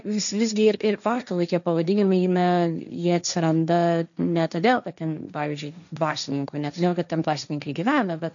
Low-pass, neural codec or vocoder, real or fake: 7.2 kHz; codec, 16 kHz, 1.1 kbps, Voila-Tokenizer; fake